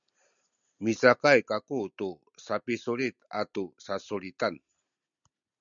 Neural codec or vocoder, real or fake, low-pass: none; real; 7.2 kHz